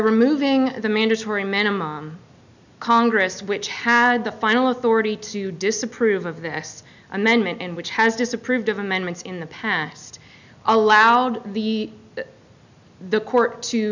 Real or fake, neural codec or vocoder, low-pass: real; none; 7.2 kHz